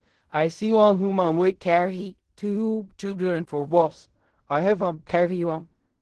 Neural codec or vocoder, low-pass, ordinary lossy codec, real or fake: codec, 16 kHz in and 24 kHz out, 0.4 kbps, LongCat-Audio-Codec, fine tuned four codebook decoder; 10.8 kHz; Opus, 16 kbps; fake